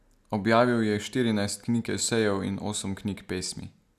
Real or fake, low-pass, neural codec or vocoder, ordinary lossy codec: real; 14.4 kHz; none; none